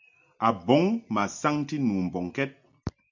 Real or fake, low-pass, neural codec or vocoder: real; 7.2 kHz; none